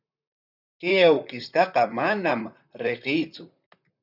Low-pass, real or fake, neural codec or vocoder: 5.4 kHz; fake; vocoder, 44.1 kHz, 128 mel bands, Pupu-Vocoder